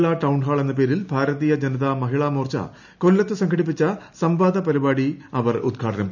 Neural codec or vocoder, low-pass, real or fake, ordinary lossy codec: none; 7.2 kHz; real; none